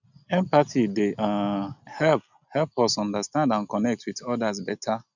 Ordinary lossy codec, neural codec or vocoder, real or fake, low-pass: none; none; real; 7.2 kHz